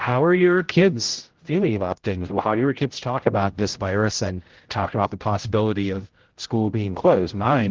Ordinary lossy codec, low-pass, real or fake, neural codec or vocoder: Opus, 16 kbps; 7.2 kHz; fake; codec, 16 kHz, 0.5 kbps, X-Codec, HuBERT features, trained on general audio